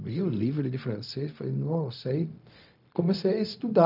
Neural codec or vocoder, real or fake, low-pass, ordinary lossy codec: codec, 16 kHz, 0.4 kbps, LongCat-Audio-Codec; fake; 5.4 kHz; none